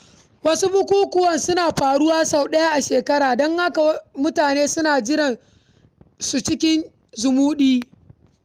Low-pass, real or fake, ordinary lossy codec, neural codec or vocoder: 14.4 kHz; real; Opus, 32 kbps; none